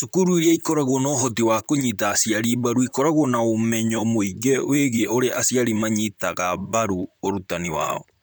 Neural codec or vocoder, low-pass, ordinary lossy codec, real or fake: vocoder, 44.1 kHz, 128 mel bands, Pupu-Vocoder; none; none; fake